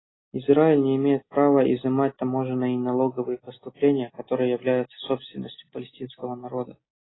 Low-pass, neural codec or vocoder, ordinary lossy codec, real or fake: 7.2 kHz; none; AAC, 16 kbps; real